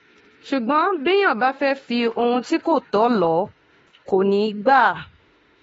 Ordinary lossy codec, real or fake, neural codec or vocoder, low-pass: AAC, 24 kbps; fake; autoencoder, 48 kHz, 32 numbers a frame, DAC-VAE, trained on Japanese speech; 19.8 kHz